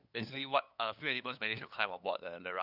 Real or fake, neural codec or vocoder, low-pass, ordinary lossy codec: fake; codec, 16 kHz, 2 kbps, FunCodec, trained on LibriTTS, 25 frames a second; 5.4 kHz; none